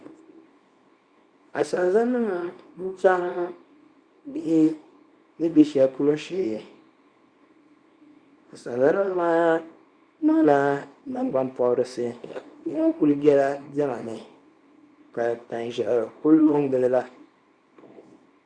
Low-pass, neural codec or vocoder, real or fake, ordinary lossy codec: 9.9 kHz; codec, 24 kHz, 0.9 kbps, WavTokenizer, small release; fake; Opus, 64 kbps